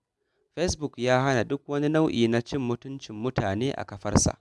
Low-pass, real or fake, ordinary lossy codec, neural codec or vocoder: none; real; none; none